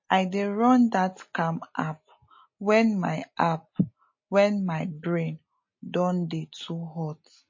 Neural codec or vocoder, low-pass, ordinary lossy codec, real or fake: none; 7.2 kHz; MP3, 32 kbps; real